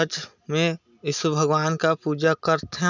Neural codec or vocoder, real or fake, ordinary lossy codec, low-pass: none; real; none; 7.2 kHz